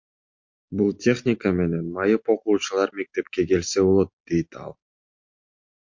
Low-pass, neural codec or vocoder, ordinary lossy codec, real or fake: 7.2 kHz; none; MP3, 48 kbps; real